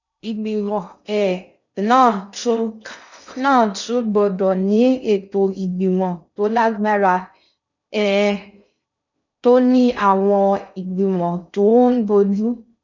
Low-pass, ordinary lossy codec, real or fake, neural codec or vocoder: 7.2 kHz; none; fake; codec, 16 kHz in and 24 kHz out, 0.6 kbps, FocalCodec, streaming, 2048 codes